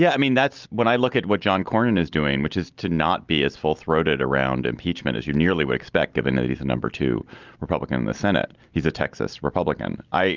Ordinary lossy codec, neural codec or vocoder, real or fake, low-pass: Opus, 24 kbps; none; real; 7.2 kHz